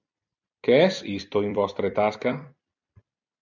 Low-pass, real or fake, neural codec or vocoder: 7.2 kHz; fake; vocoder, 44.1 kHz, 128 mel bands every 256 samples, BigVGAN v2